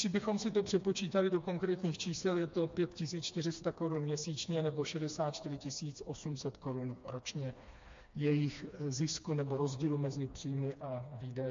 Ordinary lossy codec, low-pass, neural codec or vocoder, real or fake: MP3, 48 kbps; 7.2 kHz; codec, 16 kHz, 2 kbps, FreqCodec, smaller model; fake